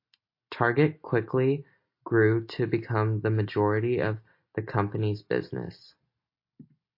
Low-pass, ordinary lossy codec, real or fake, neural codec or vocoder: 5.4 kHz; MP3, 32 kbps; real; none